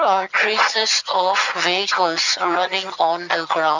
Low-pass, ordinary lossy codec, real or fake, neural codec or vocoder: 7.2 kHz; none; fake; vocoder, 22.05 kHz, 80 mel bands, HiFi-GAN